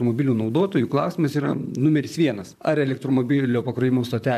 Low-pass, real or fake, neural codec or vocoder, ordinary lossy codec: 14.4 kHz; fake; vocoder, 44.1 kHz, 128 mel bands, Pupu-Vocoder; MP3, 96 kbps